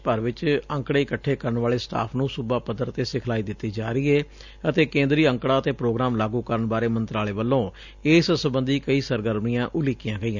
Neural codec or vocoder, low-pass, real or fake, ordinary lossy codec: none; 7.2 kHz; real; none